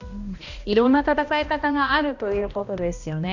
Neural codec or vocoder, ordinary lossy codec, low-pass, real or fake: codec, 16 kHz, 1 kbps, X-Codec, HuBERT features, trained on balanced general audio; none; 7.2 kHz; fake